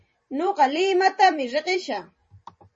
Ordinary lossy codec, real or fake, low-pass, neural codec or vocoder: MP3, 32 kbps; fake; 10.8 kHz; vocoder, 44.1 kHz, 128 mel bands every 256 samples, BigVGAN v2